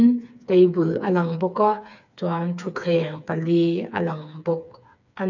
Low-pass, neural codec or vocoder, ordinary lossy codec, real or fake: 7.2 kHz; codec, 16 kHz, 4 kbps, FreqCodec, smaller model; none; fake